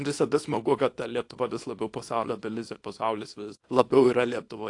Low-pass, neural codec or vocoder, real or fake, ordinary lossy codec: 10.8 kHz; codec, 24 kHz, 0.9 kbps, WavTokenizer, small release; fake; AAC, 48 kbps